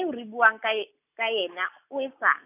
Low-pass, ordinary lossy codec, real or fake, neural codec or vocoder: 3.6 kHz; none; real; none